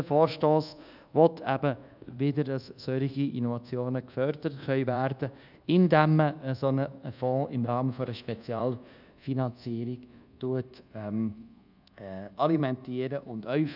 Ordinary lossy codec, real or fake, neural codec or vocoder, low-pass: none; fake; codec, 24 kHz, 1.2 kbps, DualCodec; 5.4 kHz